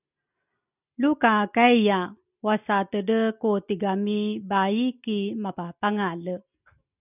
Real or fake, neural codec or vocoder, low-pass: real; none; 3.6 kHz